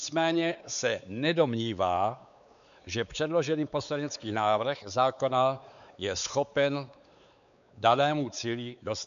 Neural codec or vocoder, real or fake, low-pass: codec, 16 kHz, 4 kbps, X-Codec, WavLM features, trained on Multilingual LibriSpeech; fake; 7.2 kHz